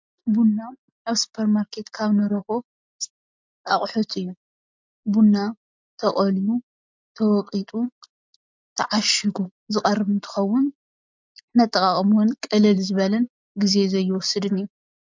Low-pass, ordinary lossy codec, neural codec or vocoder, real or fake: 7.2 kHz; MP3, 64 kbps; none; real